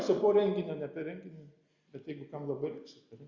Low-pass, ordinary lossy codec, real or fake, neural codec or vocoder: 7.2 kHz; Opus, 64 kbps; real; none